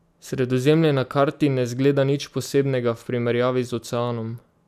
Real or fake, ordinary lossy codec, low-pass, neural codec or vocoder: real; none; 14.4 kHz; none